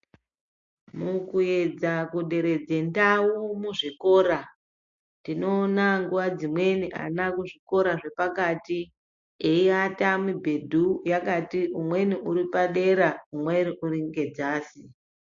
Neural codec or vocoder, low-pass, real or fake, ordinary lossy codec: none; 7.2 kHz; real; MP3, 48 kbps